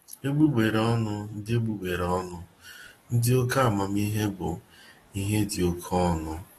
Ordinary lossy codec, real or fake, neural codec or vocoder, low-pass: AAC, 32 kbps; fake; codec, 44.1 kHz, 7.8 kbps, DAC; 19.8 kHz